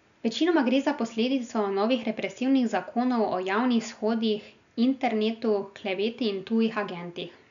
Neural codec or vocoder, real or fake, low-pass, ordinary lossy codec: none; real; 7.2 kHz; none